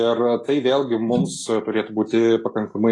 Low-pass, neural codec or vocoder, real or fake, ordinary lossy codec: 10.8 kHz; none; real; AAC, 32 kbps